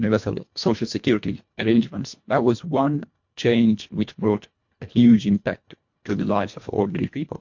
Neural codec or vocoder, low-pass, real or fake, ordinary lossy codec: codec, 24 kHz, 1.5 kbps, HILCodec; 7.2 kHz; fake; MP3, 48 kbps